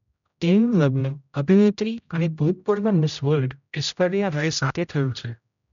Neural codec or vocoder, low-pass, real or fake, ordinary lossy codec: codec, 16 kHz, 0.5 kbps, X-Codec, HuBERT features, trained on general audio; 7.2 kHz; fake; none